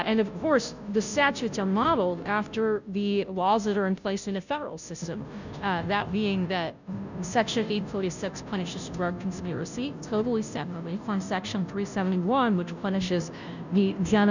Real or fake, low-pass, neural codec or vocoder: fake; 7.2 kHz; codec, 16 kHz, 0.5 kbps, FunCodec, trained on Chinese and English, 25 frames a second